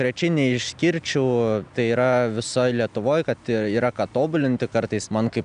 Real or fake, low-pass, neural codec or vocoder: real; 9.9 kHz; none